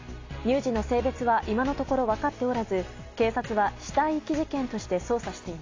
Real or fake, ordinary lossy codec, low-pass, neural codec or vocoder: real; AAC, 32 kbps; 7.2 kHz; none